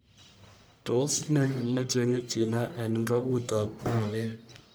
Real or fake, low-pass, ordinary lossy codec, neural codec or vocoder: fake; none; none; codec, 44.1 kHz, 1.7 kbps, Pupu-Codec